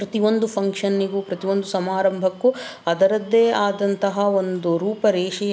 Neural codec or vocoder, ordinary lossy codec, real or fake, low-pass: none; none; real; none